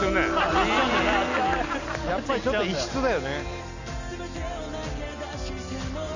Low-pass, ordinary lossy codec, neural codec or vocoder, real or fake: 7.2 kHz; none; none; real